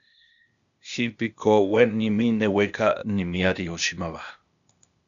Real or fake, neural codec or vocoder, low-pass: fake; codec, 16 kHz, 0.8 kbps, ZipCodec; 7.2 kHz